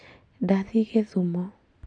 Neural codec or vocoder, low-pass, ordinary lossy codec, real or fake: none; 9.9 kHz; none; real